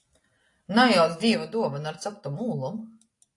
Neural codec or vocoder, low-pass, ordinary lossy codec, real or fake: none; 10.8 kHz; AAC, 48 kbps; real